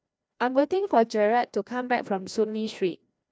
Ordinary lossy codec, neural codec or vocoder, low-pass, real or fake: none; codec, 16 kHz, 1 kbps, FreqCodec, larger model; none; fake